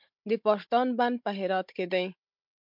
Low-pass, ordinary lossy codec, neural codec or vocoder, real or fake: 5.4 kHz; MP3, 48 kbps; codec, 16 kHz, 16 kbps, FunCodec, trained on Chinese and English, 50 frames a second; fake